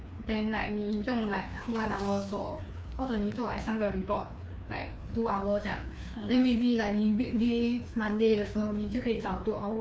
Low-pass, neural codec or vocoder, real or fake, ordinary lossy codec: none; codec, 16 kHz, 2 kbps, FreqCodec, larger model; fake; none